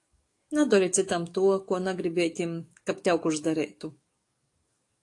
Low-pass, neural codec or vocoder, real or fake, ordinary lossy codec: 10.8 kHz; codec, 44.1 kHz, 7.8 kbps, DAC; fake; AAC, 48 kbps